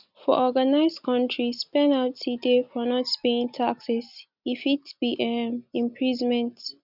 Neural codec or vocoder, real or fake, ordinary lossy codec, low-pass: none; real; none; 5.4 kHz